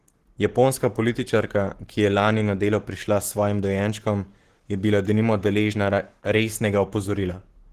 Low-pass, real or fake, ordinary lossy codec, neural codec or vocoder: 14.4 kHz; fake; Opus, 16 kbps; codec, 44.1 kHz, 7.8 kbps, Pupu-Codec